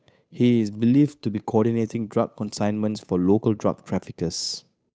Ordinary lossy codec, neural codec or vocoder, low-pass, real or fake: none; codec, 16 kHz, 8 kbps, FunCodec, trained on Chinese and English, 25 frames a second; none; fake